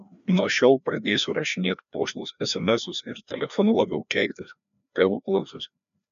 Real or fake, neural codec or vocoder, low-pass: fake; codec, 16 kHz, 1 kbps, FreqCodec, larger model; 7.2 kHz